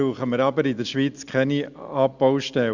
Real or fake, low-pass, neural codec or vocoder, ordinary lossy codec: real; 7.2 kHz; none; Opus, 64 kbps